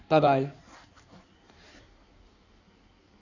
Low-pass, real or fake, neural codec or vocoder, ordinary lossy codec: 7.2 kHz; fake; codec, 16 kHz in and 24 kHz out, 1.1 kbps, FireRedTTS-2 codec; none